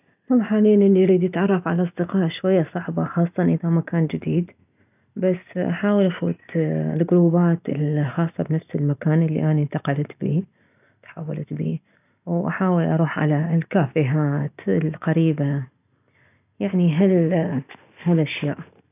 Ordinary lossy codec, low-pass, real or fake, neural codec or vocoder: none; 3.6 kHz; real; none